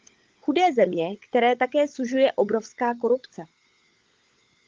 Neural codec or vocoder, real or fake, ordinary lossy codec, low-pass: codec, 16 kHz, 16 kbps, FunCodec, trained on LibriTTS, 50 frames a second; fake; Opus, 32 kbps; 7.2 kHz